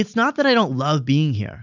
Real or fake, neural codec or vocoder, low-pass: real; none; 7.2 kHz